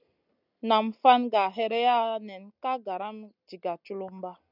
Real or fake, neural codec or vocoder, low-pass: real; none; 5.4 kHz